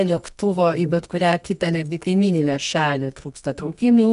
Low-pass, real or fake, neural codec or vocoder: 10.8 kHz; fake; codec, 24 kHz, 0.9 kbps, WavTokenizer, medium music audio release